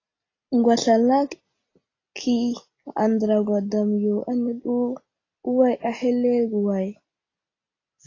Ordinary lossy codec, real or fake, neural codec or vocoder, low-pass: AAC, 32 kbps; real; none; 7.2 kHz